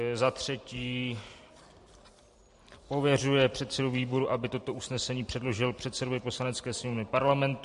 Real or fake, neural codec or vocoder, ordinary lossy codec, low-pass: fake; vocoder, 44.1 kHz, 128 mel bands every 512 samples, BigVGAN v2; MP3, 48 kbps; 14.4 kHz